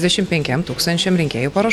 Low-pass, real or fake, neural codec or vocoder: 19.8 kHz; real; none